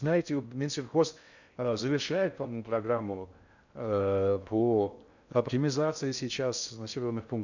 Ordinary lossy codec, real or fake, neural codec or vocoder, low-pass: none; fake; codec, 16 kHz in and 24 kHz out, 0.6 kbps, FocalCodec, streaming, 4096 codes; 7.2 kHz